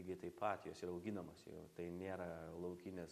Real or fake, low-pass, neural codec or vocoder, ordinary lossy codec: real; 14.4 kHz; none; MP3, 96 kbps